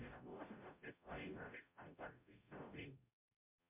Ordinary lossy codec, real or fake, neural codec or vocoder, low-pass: MP3, 32 kbps; fake; codec, 44.1 kHz, 0.9 kbps, DAC; 3.6 kHz